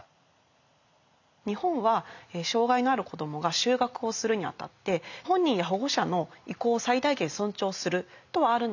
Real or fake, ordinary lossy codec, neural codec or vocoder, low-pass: real; none; none; 7.2 kHz